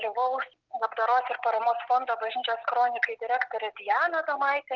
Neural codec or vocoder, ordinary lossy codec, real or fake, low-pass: none; Opus, 16 kbps; real; 7.2 kHz